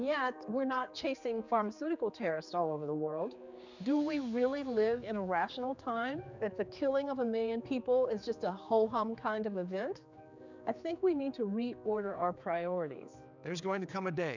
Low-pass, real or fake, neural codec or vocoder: 7.2 kHz; fake; codec, 16 kHz, 4 kbps, X-Codec, HuBERT features, trained on general audio